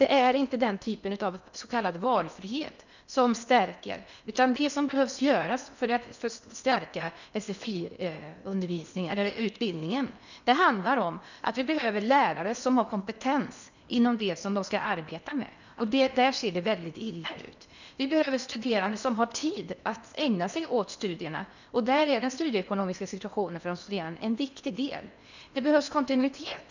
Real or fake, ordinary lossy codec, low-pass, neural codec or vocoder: fake; none; 7.2 kHz; codec, 16 kHz in and 24 kHz out, 0.8 kbps, FocalCodec, streaming, 65536 codes